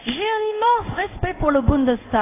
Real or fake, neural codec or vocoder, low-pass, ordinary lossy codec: fake; codec, 16 kHz in and 24 kHz out, 1 kbps, XY-Tokenizer; 3.6 kHz; AAC, 24 kbps